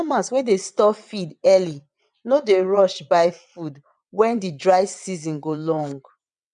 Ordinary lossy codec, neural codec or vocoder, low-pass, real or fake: none; vocoder, 22.05 kHz, 80 mel bands, WaveNeXt; 9.9 kHz; fake